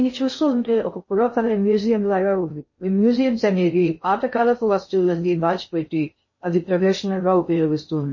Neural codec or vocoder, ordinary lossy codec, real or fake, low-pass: codec, 16 kHz in and 24 kHz out, 0.6 kbps, FocalCodec, streaming, 2048 codes; MP3, 32 kbps; fake; 7.2 kHz